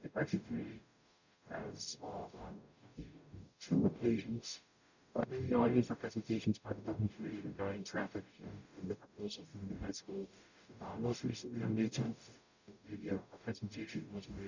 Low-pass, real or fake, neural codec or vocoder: 7.2 kHz; fake; codec, 44.1 kHz, 0.9 kbps, DAC